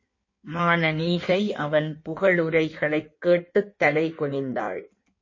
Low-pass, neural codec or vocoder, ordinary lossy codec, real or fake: 7.2 kHz; codec, 16 kHz in and 24 kHz out, 1.1 kbps, FireRedTTS-2 codec; MP3, 32 kbps; fake